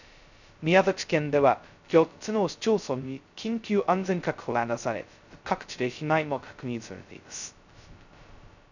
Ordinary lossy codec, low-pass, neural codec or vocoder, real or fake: none; 7.2 kHz; codec, 16 kHz, 0.2 kbps, FocalCodec; fake